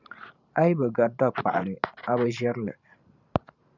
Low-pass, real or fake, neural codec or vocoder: 7.2 kHz; fake; vocoder, 44.1 kHz, 128 mel bands every 512 samples, BigVGAN v2